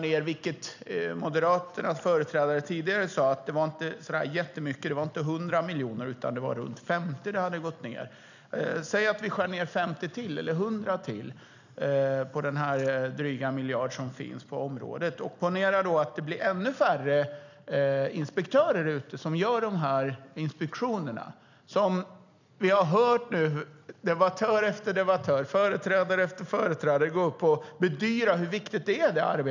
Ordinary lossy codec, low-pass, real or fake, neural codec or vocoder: none; 7.2 kHz; real; none